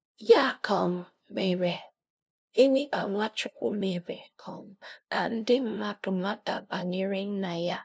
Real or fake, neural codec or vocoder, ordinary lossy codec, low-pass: fake; codec, 16 kHz, 0.5 kbps, FunCodec, trained on LibriTTS, 25 frames a second; none; none